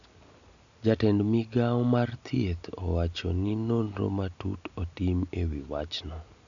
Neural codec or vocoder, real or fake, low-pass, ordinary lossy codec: none; real; 7.2 kHz; none